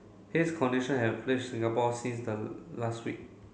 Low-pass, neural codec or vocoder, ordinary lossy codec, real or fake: none; none; none; real